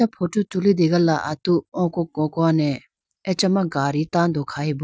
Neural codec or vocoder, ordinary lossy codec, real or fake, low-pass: none; none; real; none